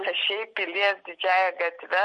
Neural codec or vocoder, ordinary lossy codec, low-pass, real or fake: none; MP3, 48 kbps; 9.9 kHz; real